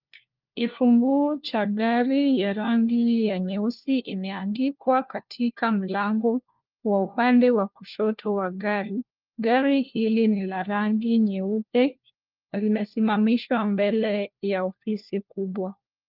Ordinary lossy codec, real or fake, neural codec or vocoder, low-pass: Opus, 32 kbps; fake; codec, 16 kHz, 1 kbps, FunCodec, trained on LibriTTS, 50 frames a second; 5.4 kHz